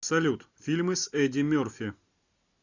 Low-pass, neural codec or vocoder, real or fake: 7.2 kHz; none; real